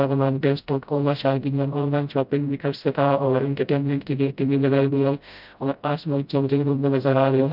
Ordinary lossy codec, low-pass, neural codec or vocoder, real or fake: none; 5.4 kHz; codec, 16 kHz, 0.5 kbps, FreqCodec, smaller model; fake